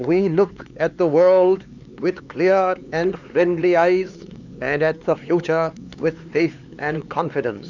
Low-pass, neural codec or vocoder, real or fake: 7.2 kHz; codec, 16 kHz, 4 kbps, X-Codec, HuBERT features, trained on LibriSpeech; fake